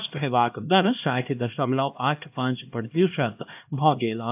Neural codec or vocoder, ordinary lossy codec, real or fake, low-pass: codec, 16 kHz, 1 kbps, X-Codec, HuBERT features, trained on LibriSpeech; AAC, 32 kbps; fake; 3.6 kHz